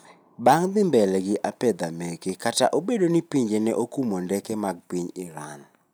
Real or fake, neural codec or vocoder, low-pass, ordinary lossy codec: real; none; none; none